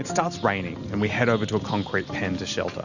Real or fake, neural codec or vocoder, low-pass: real; none; 7.2 kHz